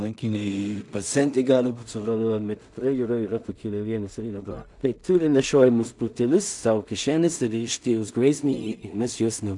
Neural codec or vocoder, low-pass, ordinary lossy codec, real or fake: codec, 16 kHz in and 24 kHz out, 0.4 kbps, LongCat-Audio-Codec, two codebook decoder; 10.8 kHz; MP3, 96 kbps; fake